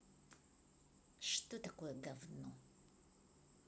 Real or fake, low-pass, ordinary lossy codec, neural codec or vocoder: real; none; none; none